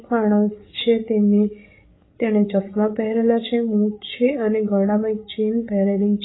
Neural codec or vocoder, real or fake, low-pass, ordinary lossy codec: codec, 16 kHz, 8 kbps, FreqCodec, larger model; fake; 7.2 kHz; AAC, 16 kbps